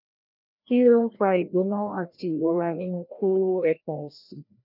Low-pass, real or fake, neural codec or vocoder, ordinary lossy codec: 5.4 kHz; fake; codec, 16 kHz, 1 kbps, FreqCodec, larger model; none